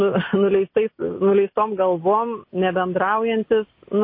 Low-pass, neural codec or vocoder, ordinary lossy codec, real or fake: 5.4 kHz; none; MP3, 24 kbps; real